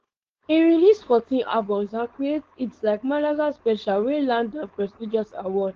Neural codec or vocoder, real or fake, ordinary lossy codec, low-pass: codec, 16 kHz, 4.8 kbps, FACodec; fake; Opus, 16 kbps; 7.2 kHz